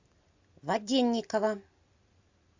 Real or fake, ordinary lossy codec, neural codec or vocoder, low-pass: real; AAC, 48 kbps; none; 7.2 kHz